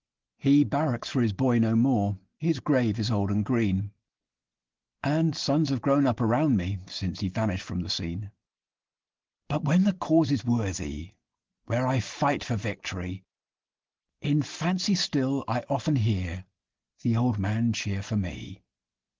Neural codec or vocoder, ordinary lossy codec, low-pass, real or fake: none; Opus, 16 kbps; 7.2 kHz; real